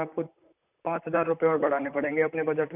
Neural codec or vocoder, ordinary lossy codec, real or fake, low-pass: vocoder, 44.1 kHz, 128 mel bands, Pupu-Vocoder; none; fake; 3.6 kHz